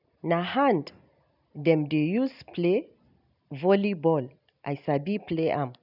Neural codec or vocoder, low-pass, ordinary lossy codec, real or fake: none; 5.4 kHz; none; real